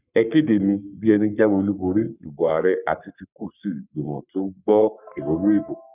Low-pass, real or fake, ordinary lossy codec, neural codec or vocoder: 3.6 kHz; fake; none; codec, 44.1 kHz, 3.4 kbps, Pupu-Codec